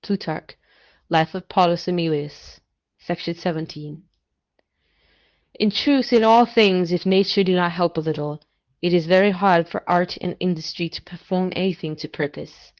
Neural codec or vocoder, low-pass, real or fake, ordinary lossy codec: codec, 24 kHz, 0.9 kbps, WavTokenizer, medium speech release version 2; 7.2 kHz; fake; Opus, 24 kbps